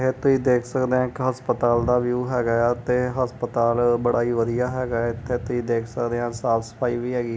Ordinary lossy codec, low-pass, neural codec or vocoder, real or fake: none; none; none; real